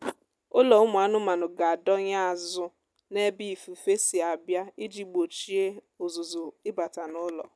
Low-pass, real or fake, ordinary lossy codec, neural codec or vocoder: none; real; none; none